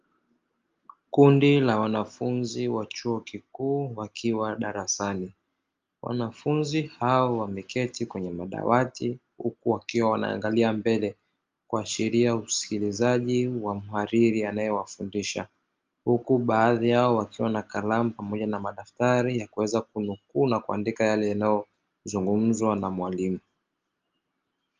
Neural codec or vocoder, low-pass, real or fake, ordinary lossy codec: none; 7.2 kHz; real; Opus, 32 kbps